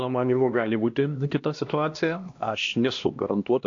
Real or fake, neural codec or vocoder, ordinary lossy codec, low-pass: fake; codec, 16 kHz, 1 kbps, X-Codec, HuBERT features, trained on LibriSpeech; AAC, 48 kbps; 7.2 kHz